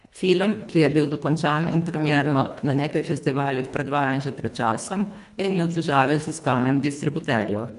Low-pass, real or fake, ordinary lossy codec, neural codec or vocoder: 10.8 kHz; fake; none; codec, 24 kHz, 1.5 kbps, HILCodec